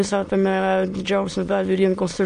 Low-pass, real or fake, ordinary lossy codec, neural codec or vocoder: 9.9 kHz; fake; MP3, 48 kbps; autoencoder, 22.05 kHz, a latent of 192 numbers a frame, VITS, trained on many speakers